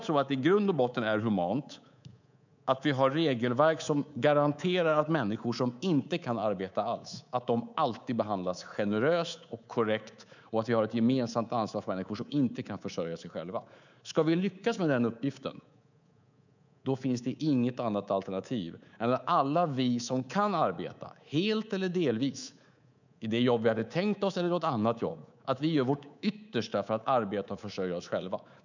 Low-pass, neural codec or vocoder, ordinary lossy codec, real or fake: 7.2 kHz; codec, 24 kHz, 3.1 kbps, DualCodec; none; fake